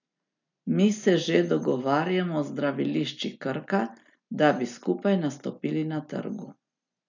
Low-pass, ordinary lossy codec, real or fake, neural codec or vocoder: 7.2 kHz; none; real; none